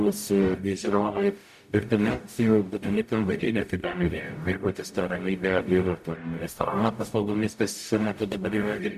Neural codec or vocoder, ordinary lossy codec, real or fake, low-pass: codec, 44.1 kHz, 0.9 kbps, DAC; MP3, 96 kbps; fake; 14.4 kHz